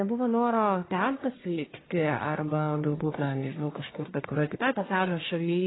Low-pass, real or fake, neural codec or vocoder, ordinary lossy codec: 7.2 kHz; fake; codec, 44.1 kHz, 1.7 kbps, Pupu-Codec; AAC, 16 kbps